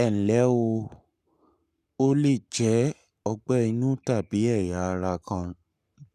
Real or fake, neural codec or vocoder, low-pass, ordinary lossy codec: fake; codec, 44.1 kHz, 7.8 kbps, Pupu-Codec; 14.4 kHz; none